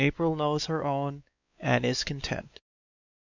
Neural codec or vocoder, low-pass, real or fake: codec, 16 kHz, 4 kbps, X-Codec, WavLM features, trained on Multilingual LibriSpeech; 7.2 kHz; fake